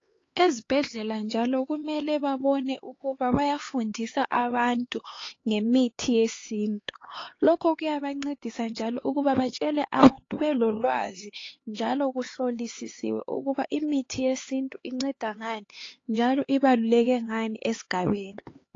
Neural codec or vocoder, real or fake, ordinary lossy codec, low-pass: codec, 16 kHz, 4 kbps, X-Codec, HuBERT features, trained on LibriSpeech; fake; AAC, 32 kbps; 7.2 kHz